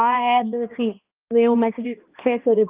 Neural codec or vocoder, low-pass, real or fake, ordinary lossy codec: codec, 16 kHz, 1 kbps, X-Codec, HuBERT features, trained on balanced general audio; 3.6 kHz; fake; Opus, 32 kbps